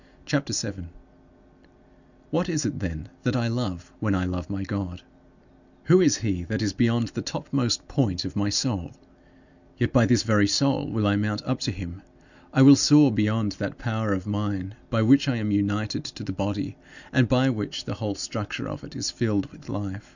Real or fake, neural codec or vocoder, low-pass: real; none; 7.2 kHz